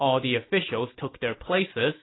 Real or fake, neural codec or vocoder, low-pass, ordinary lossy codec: real; none; 7.2 kHz; AAC, 16 kbps